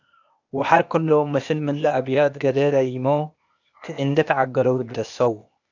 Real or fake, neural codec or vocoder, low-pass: fake; codec, 16 kHz, 0.8 kbps, ZipCodec; 7.2 kHz